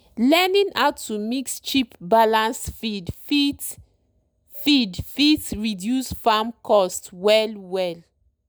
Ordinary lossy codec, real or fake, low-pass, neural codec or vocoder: none; real; none; none